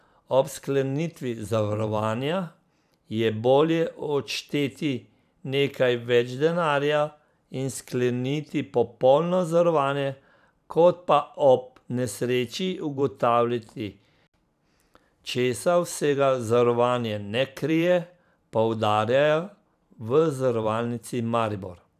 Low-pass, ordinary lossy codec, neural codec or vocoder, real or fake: 14.4 kHz; none; vocoder, 44.1 kHz, 128 mel bands every 512 samples, BigVGAN v2; fake